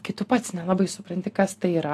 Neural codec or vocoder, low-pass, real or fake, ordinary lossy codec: none; 14.4 kHz; real; AAC, 64 kbps